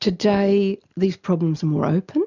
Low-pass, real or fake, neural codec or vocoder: 7.2 kHz; real; none